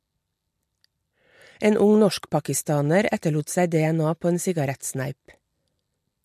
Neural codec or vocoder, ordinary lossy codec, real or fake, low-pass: none; MP3, 64 kbps; real; 14.4 kHz